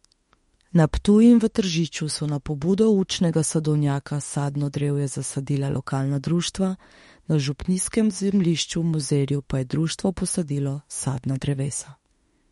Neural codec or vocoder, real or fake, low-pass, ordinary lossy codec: autoencoder, 48 kHz, 32 numbers a frame, DAC-VAE, trained on Japanese speech; fake; 19.8 kHz; MP3, 48 kbps